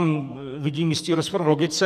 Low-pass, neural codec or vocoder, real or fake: 14.4 kHz; codec, 44.1 kHz, 2.6 kbps, SNAC; fake